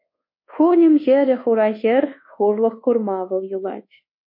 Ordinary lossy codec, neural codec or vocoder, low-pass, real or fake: MP3, 32 kbps; codec, 24 kHz, 1.2 kbps, DualCodec; 5.4 kHz; fake